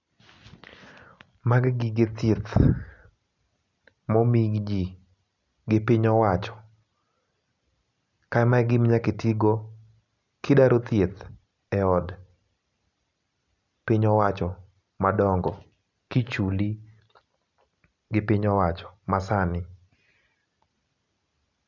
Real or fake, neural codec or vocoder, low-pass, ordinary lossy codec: real; none; 7.2 kHz; none